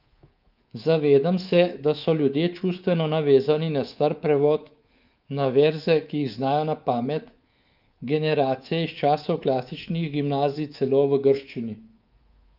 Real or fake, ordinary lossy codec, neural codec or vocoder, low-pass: fake; Opus, 32 kbps; codec, 24 kHz, 3.1 kbps, DualCodec; 5.4 kHz